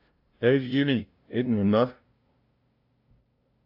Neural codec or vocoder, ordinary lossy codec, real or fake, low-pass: codec, 16 kHz, 0.5 kbps, FunCodec, trained on LibriTTS, 25 frames a second; AAC, 48 kbps; fake; 5.4 kHz